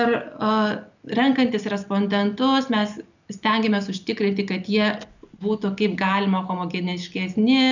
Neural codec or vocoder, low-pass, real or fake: none; 7.2 kHz; real